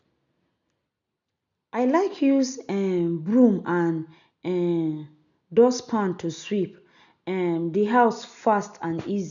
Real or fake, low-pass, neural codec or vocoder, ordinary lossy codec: real; 7.2 kHz; none; none